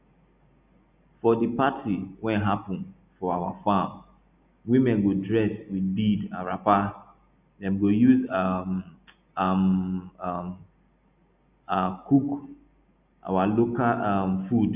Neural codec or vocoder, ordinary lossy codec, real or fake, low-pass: none; none; real; 3.6 kHz